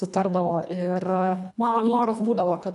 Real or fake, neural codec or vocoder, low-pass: fake; codec, 24 kHz, 1.5 kbps, HILCodec; 10.8 kHz